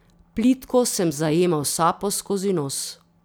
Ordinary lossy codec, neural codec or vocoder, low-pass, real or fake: none; none; none; real